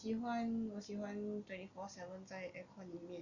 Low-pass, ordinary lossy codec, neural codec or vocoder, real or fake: 7.2 kHz; none; none; real